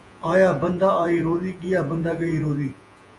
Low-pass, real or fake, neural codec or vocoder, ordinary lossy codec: 10.8 kHz; fake; vocoder, 48 kHz, 128 mel bands, Vocos; AAC, 64 kbps